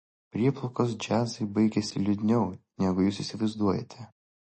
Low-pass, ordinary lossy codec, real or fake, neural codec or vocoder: 10.8 kHz; MP3, 32 kbps; real; none